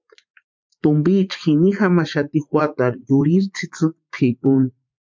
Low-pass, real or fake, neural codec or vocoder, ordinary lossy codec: 7.2 kHz; fake; vocoder, 44.1 kHz, 80 mel bands, Vocos; MP3, 64 kbps